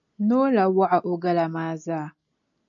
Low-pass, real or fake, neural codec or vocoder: 7.2 kHz; real; none